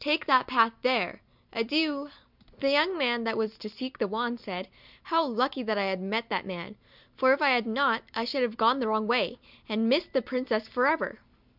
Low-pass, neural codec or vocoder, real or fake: 5.4 kHz; none; real